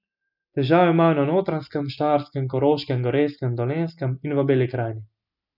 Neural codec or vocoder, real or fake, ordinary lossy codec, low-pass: none; real; none; 5.4 kHz